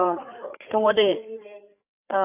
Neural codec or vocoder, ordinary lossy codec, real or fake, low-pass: codec, 16 kHz, 8 kbps, FreqCodec, larger model; none; fake; 3.6 kHz